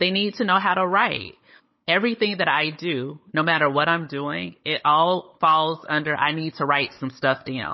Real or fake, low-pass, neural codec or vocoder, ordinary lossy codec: fake; 7.2 kHz; codec, 16 kHz, 16 kbps, FunCodec, trained on Chinese and English, 50 frames a second; MP3, 24 kbps